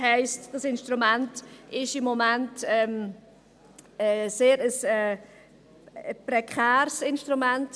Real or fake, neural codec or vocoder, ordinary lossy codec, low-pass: real; none; none; none